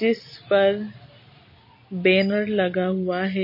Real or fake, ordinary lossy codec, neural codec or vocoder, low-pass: real; MP3, 24 kbps; none; 5.4 kHz